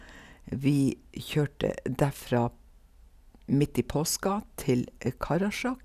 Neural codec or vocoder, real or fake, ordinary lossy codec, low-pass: none; real; none; 14.4 kHz